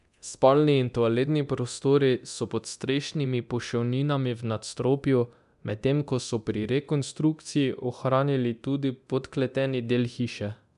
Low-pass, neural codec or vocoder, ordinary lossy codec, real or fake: 10.8 kHz; codec, 24 kHz, 0.9 kbps, DualCodec; none; fake